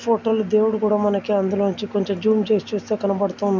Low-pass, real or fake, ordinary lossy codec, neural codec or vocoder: 7.2 kHz; real; none; none